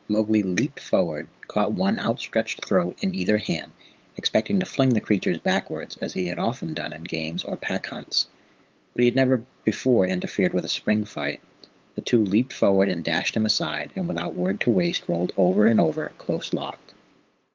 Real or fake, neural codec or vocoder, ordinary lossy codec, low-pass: fake; codec, 16 kHz, 8 kbps, FunCodec, trained on LibriTTS, 25 frames a second; Opus, 24 kbps; 7.2 kHz